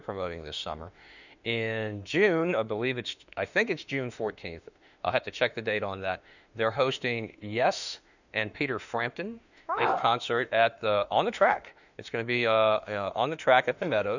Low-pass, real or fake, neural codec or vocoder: 7.2 kHz; fake; autoencoder, 48 kHz, 32 numbers a frame, DAC-VAE, trained on Japanese speech